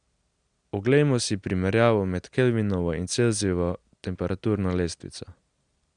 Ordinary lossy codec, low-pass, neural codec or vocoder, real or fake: Opus, 64 kbps; 9.9 kHz; none; real